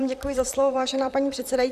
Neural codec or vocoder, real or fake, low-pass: vocoder, 44.1 kHz, 128 mel bands, Pupu-Vocoder; fake; 14.4 kHz